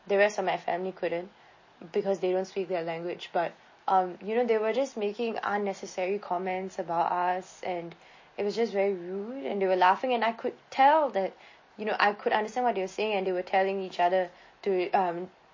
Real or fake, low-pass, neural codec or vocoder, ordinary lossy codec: real; 7.2 kHz; none; MP3, 32 kbps